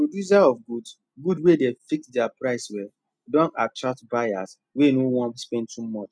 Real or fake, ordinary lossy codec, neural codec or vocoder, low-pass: real; none; none; none